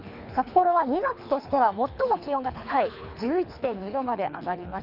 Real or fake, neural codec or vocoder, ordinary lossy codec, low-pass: fake; codec, 24 kHz, 3 kbps, HILCodec; none; 5.4 kHz